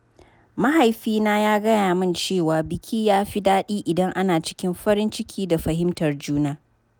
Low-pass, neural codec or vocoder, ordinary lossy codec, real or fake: none; none; none; real